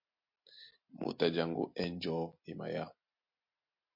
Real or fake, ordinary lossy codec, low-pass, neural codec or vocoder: real; MP3, 32 kbps; 5.4 kHz; none